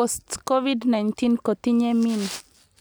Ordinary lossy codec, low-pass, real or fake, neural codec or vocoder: none; none; real; none